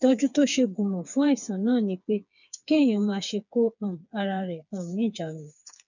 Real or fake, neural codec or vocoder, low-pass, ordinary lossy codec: fake; codec, 16 kHz, 4 kbps, FreqCodec, smaller model; 7.2 kHz; none